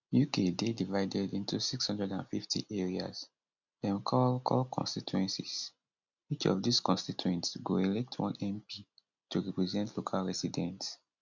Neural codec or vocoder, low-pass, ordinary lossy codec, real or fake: none; 7.2 kHz; none; real